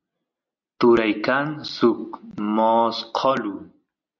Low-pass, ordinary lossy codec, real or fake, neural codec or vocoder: 7.2 kHz; MP3, 48 kbps; real; none